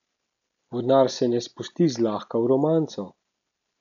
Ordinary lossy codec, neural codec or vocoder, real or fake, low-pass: none; none; real; 7.2 kHz